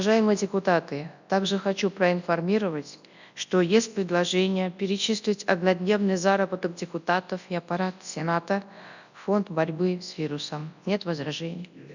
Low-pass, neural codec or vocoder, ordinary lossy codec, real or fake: 7.2 kHz; codec, 24 kHz, 0.9 kbps, WavTokenizer, large speech release; none; fake